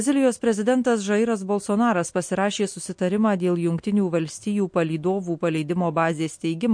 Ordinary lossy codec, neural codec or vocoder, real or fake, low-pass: MP3, 48 kbps; none; real; 9.9 kHz